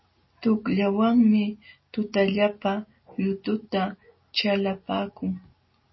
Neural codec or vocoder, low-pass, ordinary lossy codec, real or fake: none; 7.2 kHz; MP3, 24 kbps; real